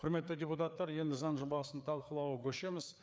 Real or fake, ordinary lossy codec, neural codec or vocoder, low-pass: fake; none; codec, 16 kHz, 4 kbps, FreqCodec, larger model; none